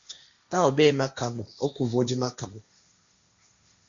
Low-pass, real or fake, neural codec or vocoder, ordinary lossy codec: 7.2 kHz; fake; codec, 16 kHz, 1.1 kbps, Voila-Tokenizer; Opus, 64 kbps